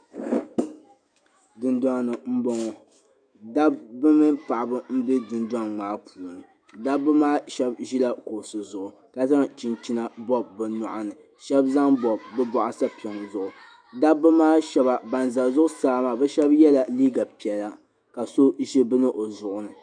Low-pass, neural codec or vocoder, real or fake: 9.9 kHz; autoencoder, 48 kHz, 128 numbers a frame, DAC-VAE, trained on Japanese speech; fake